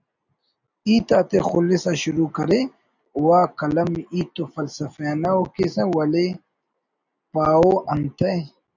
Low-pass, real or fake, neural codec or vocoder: 7.2 kHz; real; none